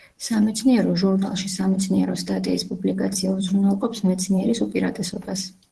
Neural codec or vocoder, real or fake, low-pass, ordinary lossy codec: vocoder, 44.1 kHz, 128 mel bands, Pupu-Vocoder; fake; 10.8 kHz; Opus, 16 kbps